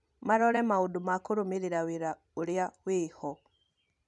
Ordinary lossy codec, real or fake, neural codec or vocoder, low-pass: none; fake; vocoder, 44.1 kHz, 128 mel bands every 256 samples, BigVGAN v2; 10.8 kHz